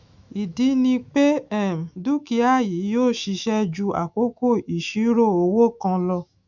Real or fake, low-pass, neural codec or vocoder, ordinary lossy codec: fake; 7.2 kHz; autoencoder, 48 kHz, 128 numbers a frame, DAC-VAE, trained on Japanese speech; none